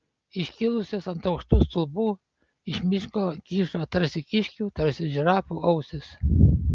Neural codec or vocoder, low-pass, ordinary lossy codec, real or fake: none; 7.2 kHz; Opus, 24 kbps; real